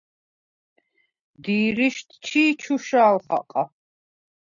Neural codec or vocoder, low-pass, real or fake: none; 7.2 kHz; real